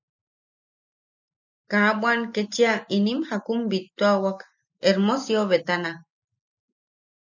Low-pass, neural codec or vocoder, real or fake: 7.2 kHz; none; real